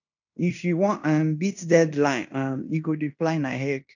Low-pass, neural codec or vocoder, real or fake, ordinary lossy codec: 7.2 kHz; codec, 16 kHz in and 24 kHz out, 0.9 kbps, LongCat-Audio-Codec, fine tuned four codebook decoder; fake; none